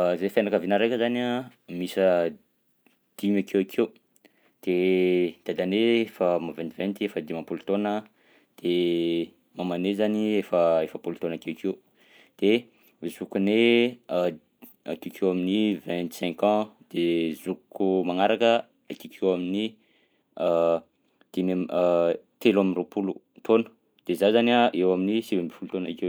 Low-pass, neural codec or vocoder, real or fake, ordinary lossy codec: none; none; real; none